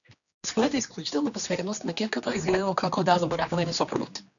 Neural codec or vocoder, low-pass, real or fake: codec, 16 kHz, 2 kbps, X-Codec, HuBERT features, trained on general audio; 7.2 kHz; fake